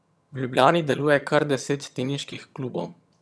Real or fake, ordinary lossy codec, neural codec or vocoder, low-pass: fake; none; vocoder, 22.05 kHz, 80 mel bands, HiFi-GAN; none